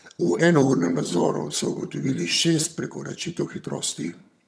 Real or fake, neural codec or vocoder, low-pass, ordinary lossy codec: fake; vocoder, 22.05 kHz, 80 mel bands, HiFi-GAN; none; none